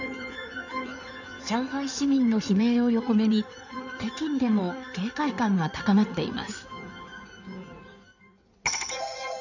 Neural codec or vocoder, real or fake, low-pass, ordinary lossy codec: codec, 16 kHz in and 24 kHz out, 2.2 kbps, FireRedTTS-2 codec; fake; 7.2 kHz; none